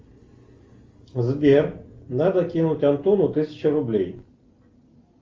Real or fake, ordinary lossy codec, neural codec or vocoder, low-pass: real; Opus, 32 kbps; none; 7.2 kHz